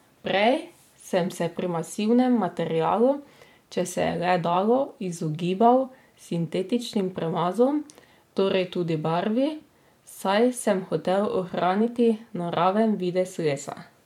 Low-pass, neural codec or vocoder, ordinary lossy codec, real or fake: 19.8 kHz; vocoder, 44.1 kHz, 128 mel bands every 512 samples, BigVGAN v2; MP3, 96 kbps; fake